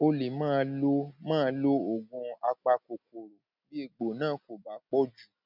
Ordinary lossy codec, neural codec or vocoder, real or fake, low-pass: none; none; real; 5.4 kHz